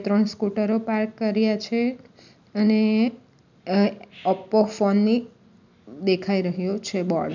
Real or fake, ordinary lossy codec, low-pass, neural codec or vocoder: real; none; 7.2 kHz; none